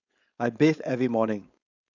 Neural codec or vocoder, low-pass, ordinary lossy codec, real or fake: codec, 16 kHz, 4.8 kbps, FACodec; 7.2 kHz; none; fake